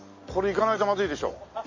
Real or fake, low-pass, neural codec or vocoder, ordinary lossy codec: real; 7.2 kHz; none; MP3, 32 kbps